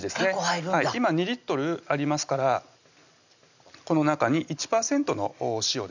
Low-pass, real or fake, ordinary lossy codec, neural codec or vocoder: 7.2 kHz; real; none; none